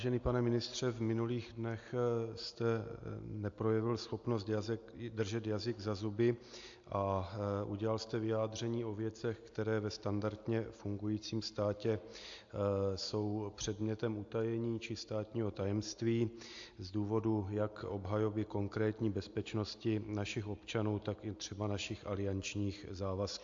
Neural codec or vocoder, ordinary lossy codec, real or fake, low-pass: none; AAC, 64 kbps; real; 7.2 kHz